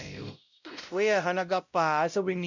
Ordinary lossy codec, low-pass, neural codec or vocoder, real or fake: none; 7.2 kHz; codec, 16 kHz, 0.5 kbps, X-Codec, WavLM features, trained on Multilingual LibriSpeech; fake